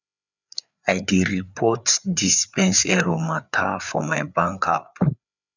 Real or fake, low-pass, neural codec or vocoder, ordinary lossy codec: fake; 7.2 kHz; codec, 16 kHz, 4 kbps, FreqCodec, larger model; none